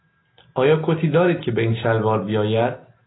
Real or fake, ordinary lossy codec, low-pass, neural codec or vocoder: real; AAC, 16 kbps; 7.2 kHz; none